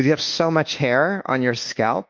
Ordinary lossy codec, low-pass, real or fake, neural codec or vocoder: Opus, 32 kbps; 7.2 kHz; fake; codec, 24 kHz, 1.2 kbps, DualCodec